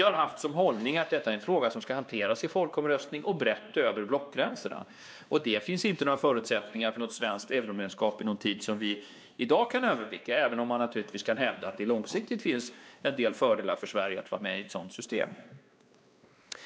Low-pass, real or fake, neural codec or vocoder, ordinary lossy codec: none; fake; codec, 16 kHz, 2 kbps, X-Codec, WavLM features, trained on Multilingual LibriSpeech; none